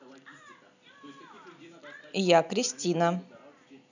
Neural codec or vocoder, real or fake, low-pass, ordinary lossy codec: none; real; 7.2 kHz; none